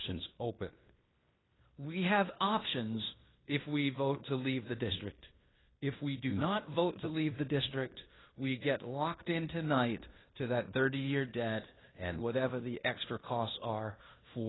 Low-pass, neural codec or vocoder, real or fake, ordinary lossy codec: 7.2 kHz; codec, 16 kHz in and 24 kHz out, 0.9 kbps, LongCat-Audio-Codec, fine tuned four codebook decoder; fake; AAC, 16 kbps